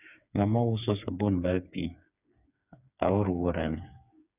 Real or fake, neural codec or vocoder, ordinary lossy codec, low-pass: fake; codec, 16 kHz, 4 kbps, FreqCodec, smaller model; none; 3.6 kHz